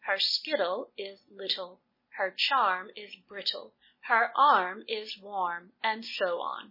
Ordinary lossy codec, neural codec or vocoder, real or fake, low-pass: MP3, 24 kbps; none; real; 5.4 kHz